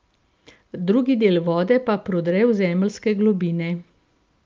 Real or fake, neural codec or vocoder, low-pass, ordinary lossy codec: real; none; 7.2 kHz; Opus, 24 kbps